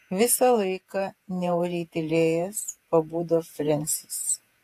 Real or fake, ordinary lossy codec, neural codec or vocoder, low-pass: fake; AAC, 64 kbps; vocoder, 48 kHz, 128 mel bands, Vocos; 14.4 kHz